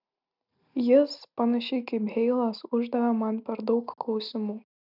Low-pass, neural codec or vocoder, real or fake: 5.4 kHz; none; real